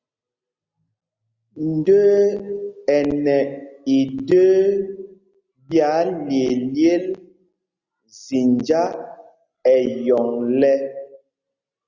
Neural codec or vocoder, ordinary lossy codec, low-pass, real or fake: none; Opus, 64 kbps; 7.2 kHz; real